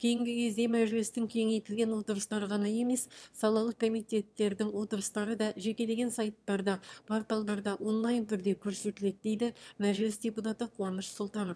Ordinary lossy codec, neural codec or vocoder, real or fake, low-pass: none; autoencoder, 22.05 kHz, a latent of 192 numbers a frame, VITS, trained on one speaker; fake; none